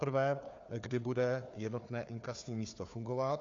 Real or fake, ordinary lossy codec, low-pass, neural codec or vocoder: fake; AAC, 48 kbps; 7.2 kHz; codec, 16 kHz, 4 kbps, FunCodec, trained on Chinese and English, 50 frames a second